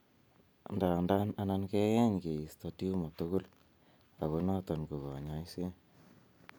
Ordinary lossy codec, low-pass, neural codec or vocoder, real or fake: none; none; none; real